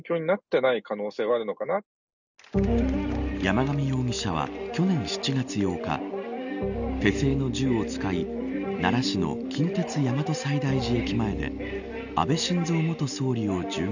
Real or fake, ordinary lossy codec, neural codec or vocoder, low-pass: real; none; none; 7.2 kHz